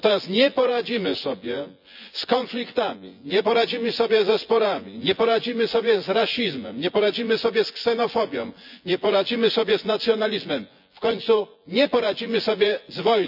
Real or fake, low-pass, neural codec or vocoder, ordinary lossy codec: fake; 5.4 kHz; vocoder, 24 kHz, 100 mel bands, Vocos; none